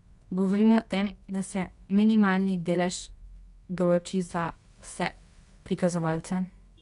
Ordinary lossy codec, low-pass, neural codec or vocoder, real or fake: none; 10.8 kHz; codec, 24 kHz, 0.9 kbps, WavTokenizer, medium music audio release; fake